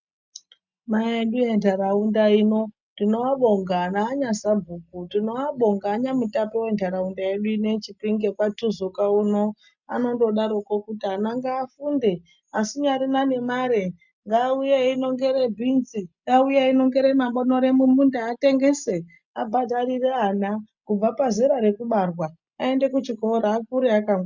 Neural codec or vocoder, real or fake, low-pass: none; real; 7.2 kHz